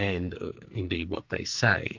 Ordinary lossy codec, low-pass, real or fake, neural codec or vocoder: MP3, 64 kbps; 7.2 kHz; fake; codec, 44.1 kHz, 2.6 kbps, SNAC